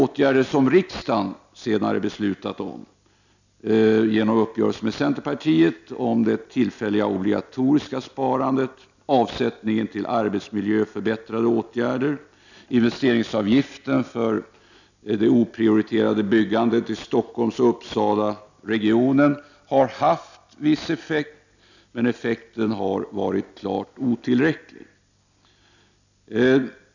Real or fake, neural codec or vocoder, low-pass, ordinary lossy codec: real; none; 7.2 kHz; none